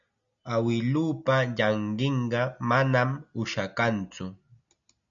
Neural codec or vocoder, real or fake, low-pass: none; real; 7.2 kHz